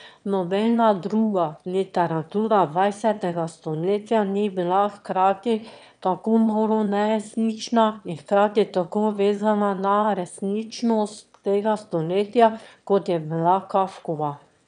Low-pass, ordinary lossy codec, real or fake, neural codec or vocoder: 9.9 kHz; none; fake; autoencoder, 22.05 kHz, a latent of 192 numbers a frame, VITS, trained on one speaker